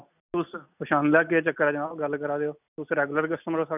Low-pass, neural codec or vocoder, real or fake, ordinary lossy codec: 3.6 kHz; none; real; none